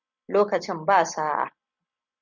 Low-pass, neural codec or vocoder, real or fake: 7.2 kHz; none; real